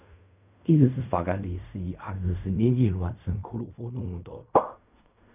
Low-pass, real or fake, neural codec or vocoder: 3.6 kHz; fake; codec, 16 kHz in and 24 kHz out, 0.4 kbps, LongCat-Audio-Codec, fine tuned four codebook decoder